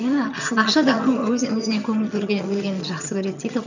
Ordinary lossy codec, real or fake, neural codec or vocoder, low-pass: none; fake; vocoder, 22.05 kHz, 80 mel bands, HiFi-GAN; 7.2 kHz